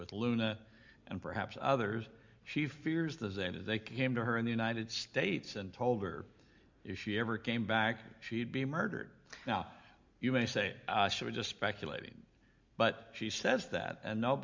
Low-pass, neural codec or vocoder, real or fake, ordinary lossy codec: 7.2 kHz; none; real; MP3, 64 kbps